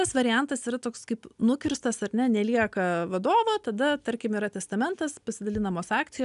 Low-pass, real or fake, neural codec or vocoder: 10.8 kHz; real; none